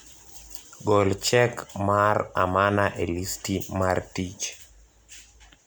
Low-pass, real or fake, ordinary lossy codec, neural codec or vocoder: none; real; none; none